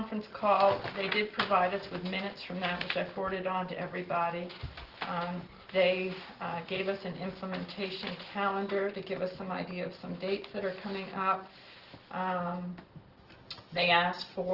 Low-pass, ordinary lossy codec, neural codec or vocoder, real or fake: 5.4 kHz; Opus, 16 kbps; none; real